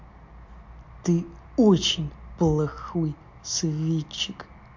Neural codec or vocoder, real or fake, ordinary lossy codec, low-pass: none; real; MP3, 48 kbps; 7.2 kHz